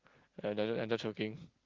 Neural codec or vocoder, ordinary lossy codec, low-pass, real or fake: none; Opus, 16 kbps; 7.2 kHz; real